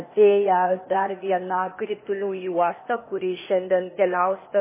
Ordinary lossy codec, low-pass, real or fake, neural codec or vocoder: MP3, 16 kbps; 3.6 kHz; fake; codec, 16 kHz, 0.8 kbps, ZipCodec